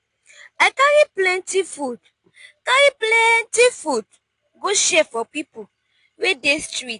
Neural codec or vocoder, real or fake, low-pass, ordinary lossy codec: none; real; 10.8 kHz; AAC, 48 kbps